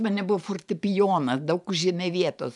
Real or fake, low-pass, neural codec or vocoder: fake; 10.8 kHz; vocoder, 44.1 kHz, 128 mel bands every 512 samples, BigVGAN v2